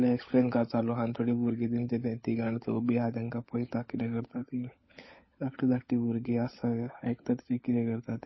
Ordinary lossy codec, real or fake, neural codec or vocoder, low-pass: MP3, 24 kbps; fake; codec, 16 kHz, 16 kbps, FunCodec, trained on LibriTTS, 50 frames a second; 7.2 kHz